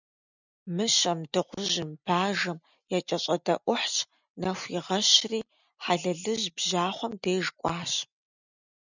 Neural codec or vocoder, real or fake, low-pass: none; real; 7.2 kHz